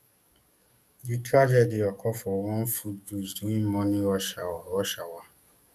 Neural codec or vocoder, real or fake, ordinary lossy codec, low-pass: codec, 44.1 kHz, 7.8 kbps, DAC; fake; none; 14.4 kHz